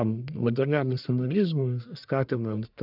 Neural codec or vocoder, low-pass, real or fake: codec, 44.1 kHz, 1.7 kbps, Pupu-Codec; 5.4 kHz; fake